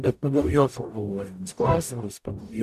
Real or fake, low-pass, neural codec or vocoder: fake; 14.4 kHz; codec, 44.1 kHz, 0.9 kbps, DAC